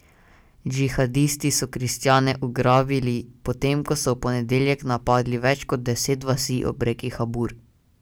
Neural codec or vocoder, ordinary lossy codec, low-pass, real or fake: none; none; none; real